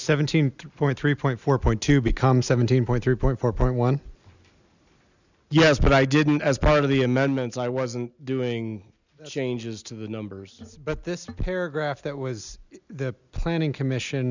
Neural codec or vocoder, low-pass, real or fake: none; 7.2 kHz; real